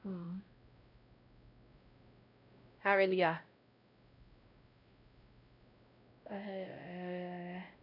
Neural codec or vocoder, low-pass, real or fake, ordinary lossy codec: codec, 16 kHz, 0.5 kbps, X-Codec, WavLM features, trained on Multilingual LibriSpeech; 5.4 kHz; fake; none